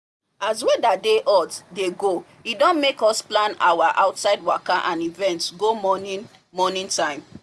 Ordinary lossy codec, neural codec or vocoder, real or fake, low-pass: none; none; real; none